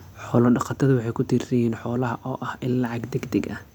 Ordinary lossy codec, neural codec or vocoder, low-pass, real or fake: none; none; 19.8 kHz; real